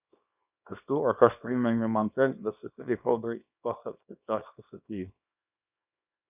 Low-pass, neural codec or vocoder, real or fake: 3.6 kHz; codec, 24 kHz, 0.9 kbps, WavTokenizer, small release; fake